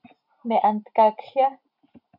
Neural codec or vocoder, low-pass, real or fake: none; 5.4 kHz; real